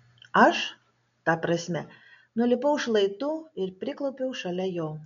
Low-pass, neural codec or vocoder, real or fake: 7.2 kHz; none; real